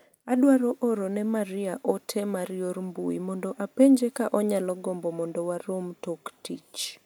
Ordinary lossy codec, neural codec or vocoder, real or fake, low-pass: none; none; real; none